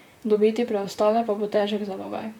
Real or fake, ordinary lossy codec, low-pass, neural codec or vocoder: fake; none; 19.8 kHz; vocoder, 44.1 kHz, 128 mel bands, Pupu-Vocoder